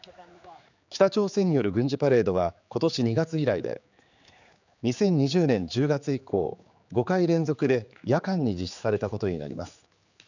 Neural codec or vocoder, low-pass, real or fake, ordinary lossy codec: codec, 16 kHz, 4 kbps, X-Codec, HuBERT features, trained on general audio; 7.2 kHz; fake; none